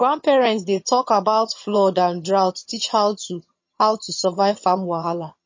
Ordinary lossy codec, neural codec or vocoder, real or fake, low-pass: MP3, 32 kbps; vocoder, 22.05 kHz, 80 mel bands, HiFi-GAN; fake; 7.2 kHz